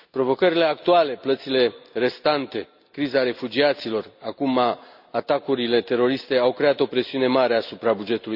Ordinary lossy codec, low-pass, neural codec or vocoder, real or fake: none; 5.4 kHz; none; real